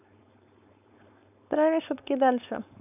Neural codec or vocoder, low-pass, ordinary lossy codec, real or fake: codec, 16 kHz, 4.8 kbps, FACodec; 3.6 kHz; none; fake